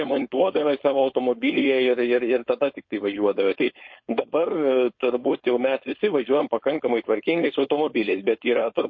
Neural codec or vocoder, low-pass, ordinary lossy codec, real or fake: codec, 16 kHz, 4.8 kbps, FACodec; 7.2 kHz; MP3, 32 kbps; fake